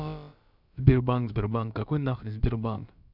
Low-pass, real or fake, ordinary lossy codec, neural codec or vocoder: 5.4 kHz; fake; none; codec, 16 kHz, about 1 kbps, DyCAST, with the encoder's durations